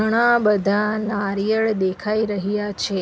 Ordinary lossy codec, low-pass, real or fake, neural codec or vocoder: none; none; real; none